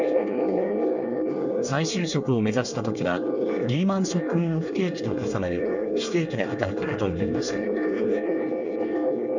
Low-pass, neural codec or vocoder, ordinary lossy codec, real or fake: 7.2 kHz; codec, 24 kHz, 1 kbps, SNAC; none; fake